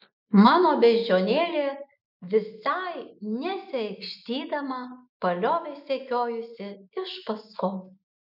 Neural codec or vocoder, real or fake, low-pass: none; real; 5.4 kHz